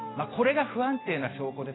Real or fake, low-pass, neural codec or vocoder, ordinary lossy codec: real; 7.2 kHz; none; AAC, 16 kbps